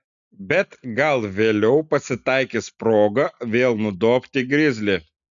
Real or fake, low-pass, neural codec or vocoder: real; 7.2 kHz; none